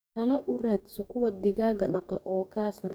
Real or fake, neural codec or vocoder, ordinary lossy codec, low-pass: fake; codec, 44.1 kHz, 2.6 kbps, DAC; none; none